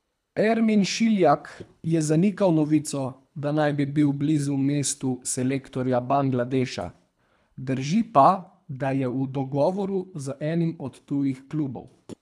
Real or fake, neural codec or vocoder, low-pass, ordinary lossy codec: fake; codec, 24 kHz, 3 kbps, HILCodec; none; none